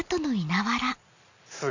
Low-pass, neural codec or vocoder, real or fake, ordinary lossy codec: 7.2 kHz; none; real; none